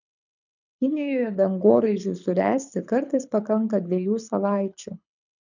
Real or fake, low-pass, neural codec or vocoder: fake; 7.2 kHz; codec, 24 kHz, 6 kbps, HILCodec